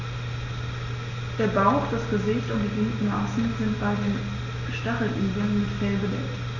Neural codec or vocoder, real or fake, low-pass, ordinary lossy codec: none; real; 7.2 kHz; none